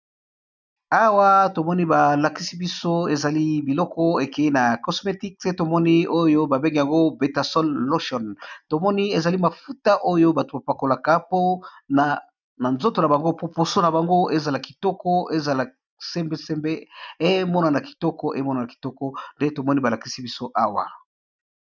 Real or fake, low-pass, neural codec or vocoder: real; 7.2 kHz; none